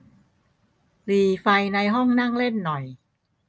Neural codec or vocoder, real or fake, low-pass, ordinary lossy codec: none; real; none; none